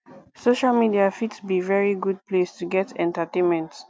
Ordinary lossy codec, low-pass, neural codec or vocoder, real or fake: none; none; none; real